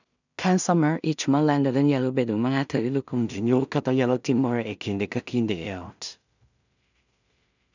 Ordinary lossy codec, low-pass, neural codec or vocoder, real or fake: none; 7.2 kHz; codec, 16 kHz in and 24 kHz out, 0.4 kbps, LongCat-Audio-Codec, two codebook decoder; fake